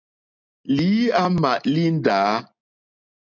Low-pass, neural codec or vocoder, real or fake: 7.2 kHz; none; real